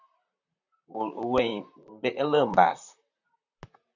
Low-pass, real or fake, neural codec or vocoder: 7.2 kHz; fake; vocoder, 44.1 kHz, 128 mel bands, Pupu-Vocoder